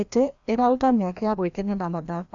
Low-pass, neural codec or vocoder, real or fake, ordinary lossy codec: 7.2 kHz; codec, 16 kHz, 1 kbps, FreqCodec, larger model; fake; AAC, 64 kbps